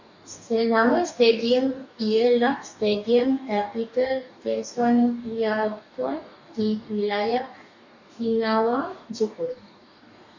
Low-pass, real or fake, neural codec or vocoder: 7.2 kHz; fake; codec, 44.1 kHz, 2.6 kbps, DAC